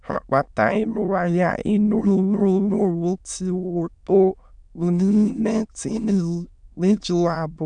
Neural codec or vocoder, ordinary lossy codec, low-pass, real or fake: autoencoder, 22.05 kHz, a latent of 192 numbers a frame, VITS, trained on many speakers; none; 9.9 kHz; fake